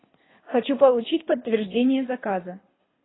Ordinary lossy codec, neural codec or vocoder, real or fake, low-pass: AAC, 16 kbps; codec, 24 kHz, 3 kbps, HILCodec; fake; 7.2 kHz